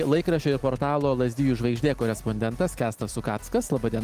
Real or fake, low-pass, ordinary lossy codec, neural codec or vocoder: real; 14.4 kHz; Opus, 24 kbps; none